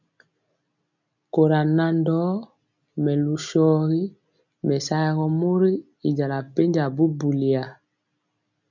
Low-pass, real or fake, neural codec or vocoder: 7.2 kHz; real; none